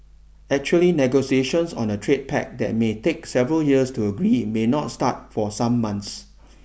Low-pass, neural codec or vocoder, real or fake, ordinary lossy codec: none; none; real; none